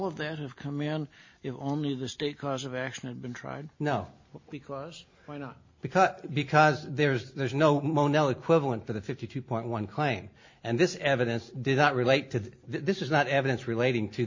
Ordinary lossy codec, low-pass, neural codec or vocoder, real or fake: MP3, 32 kbps; 7.2 kHz; none; real